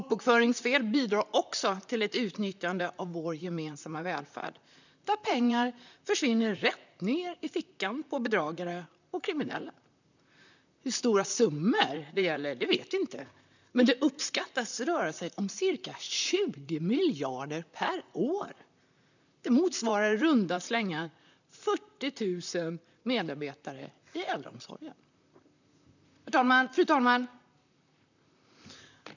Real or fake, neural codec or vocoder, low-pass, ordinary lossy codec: fake; vocoder, 44.1 kHz, 128 mel bands, Pupu-Vocoder; 7.2 kHz; none